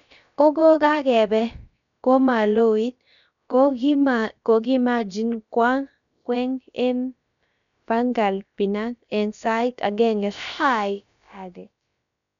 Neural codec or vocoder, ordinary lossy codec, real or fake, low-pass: codec, 16 kHz, about 1 kbps, DyCAST, with the encoder's durations; none; fake; 7.2 kHz